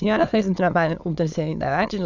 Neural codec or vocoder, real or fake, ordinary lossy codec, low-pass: autoencoder, 22.05 kHz, a latent of 192 numbers a frame, VITS, trained on many speakers; fake; none; 7.2 kHz